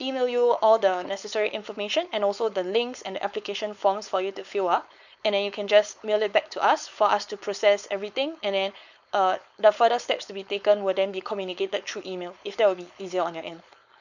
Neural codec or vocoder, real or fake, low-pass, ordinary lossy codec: codec, 16 kHz, 4.8 kbps, FACodec; fake; 7.2 kHz; none